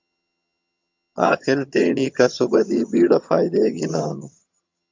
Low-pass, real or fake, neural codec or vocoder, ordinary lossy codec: 7.2 kHz; fake; vocoder, 22.05 kHz, 80 mel bands, HiFi-GAN; MP3, 64 kbps